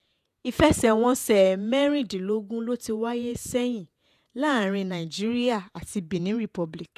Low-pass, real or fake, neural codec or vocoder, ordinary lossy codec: 14.4 kHz; fake; vocoder, 48 kHz, 128 mel bands, Vocos; none